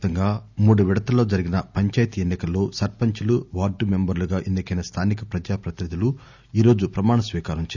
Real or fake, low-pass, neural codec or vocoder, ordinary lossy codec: real; 7.2 kHz; none; none